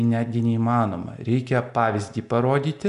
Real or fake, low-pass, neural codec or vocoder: real; 10.8 kHz; none